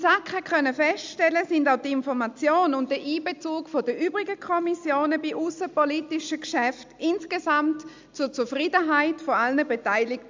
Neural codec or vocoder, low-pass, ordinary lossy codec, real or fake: none; 7.2 kHz; MP3, 64 kbps; real